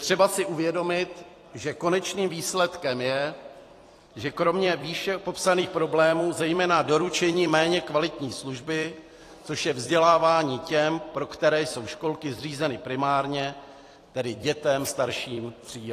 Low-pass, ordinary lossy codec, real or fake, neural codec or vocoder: 14.4 kHz; AAC, 48 kbps; fake; vocoder, 44.1 kHz, 128 mel bands every 256 samples, BigVGAN v2